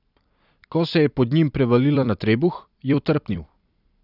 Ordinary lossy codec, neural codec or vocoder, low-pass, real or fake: none; vocoder, 44.1 kHz, 128 mel bands every 256 samples, BigVGAN v2; 5.4 kHz; fake